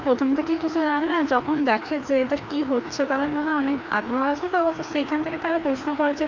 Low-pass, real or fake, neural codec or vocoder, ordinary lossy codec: 7.2 kHz; fake; codec, 16 kHz, 2 kbps, FreqCodec, larger model; none